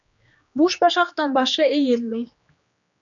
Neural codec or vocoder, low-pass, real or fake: codec, 16 kHz, 2 kbps, X-Codec, HuBERT features, trained on general audio; 7.2 kHz; fake